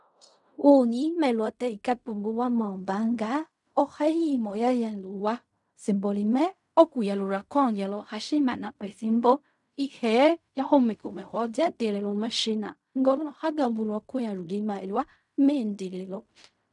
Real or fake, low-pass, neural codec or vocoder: fake; 10.8 kHz; codec, 16 kHz in and 24 kHz out, 0.4 kbps, LongCat-Audio-Codec, fine tuned four codebook decoder